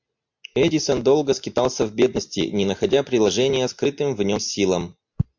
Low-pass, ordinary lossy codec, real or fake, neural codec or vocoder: 7.2 kHz; MP3, 48 kbps; real; none